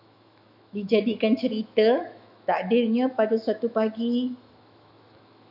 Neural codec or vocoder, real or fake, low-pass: autoencoder, 48 kHz, 128 numbers a frame, DAC-VAE, trained on Japanese speech; fake; 5.4 kHz